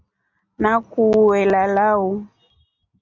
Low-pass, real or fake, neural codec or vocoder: 7.2 kHz; real; none